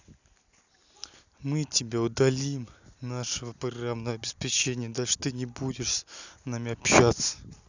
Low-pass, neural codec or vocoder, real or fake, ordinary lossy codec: 7.2 kHz; none; real; none